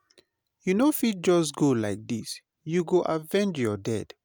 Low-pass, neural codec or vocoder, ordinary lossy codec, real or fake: none; none; none; real